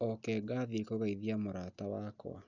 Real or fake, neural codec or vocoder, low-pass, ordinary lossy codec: real; none; 7.2 kHz; none